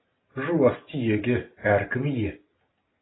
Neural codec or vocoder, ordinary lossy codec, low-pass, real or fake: none; AAC, 16 kbps; 7.2 kHz; real